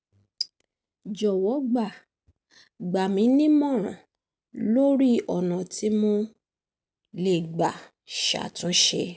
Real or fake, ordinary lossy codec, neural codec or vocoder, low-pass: real; none; none; none